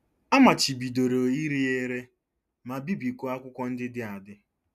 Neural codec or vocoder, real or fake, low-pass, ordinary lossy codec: none; real; 14.4 kHz; none